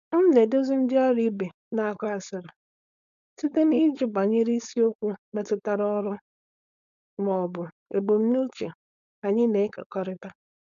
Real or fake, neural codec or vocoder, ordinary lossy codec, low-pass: fake; codec, 16 kHz, 4.8 kbps, FACodec; AAC, 96 kbps; 7.2 kHz